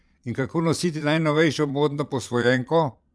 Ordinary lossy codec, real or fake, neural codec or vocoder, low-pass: none; fake; vocoder, 22.05 kHz, 80 mel bands, Vocos; none